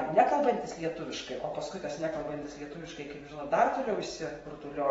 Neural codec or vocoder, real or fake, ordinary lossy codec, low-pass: vocoder, 44.1 kHz, 128 mel bands every 512 samples, BigVGAN v2; fake; AAC, 24 kbps; 19.8 kHz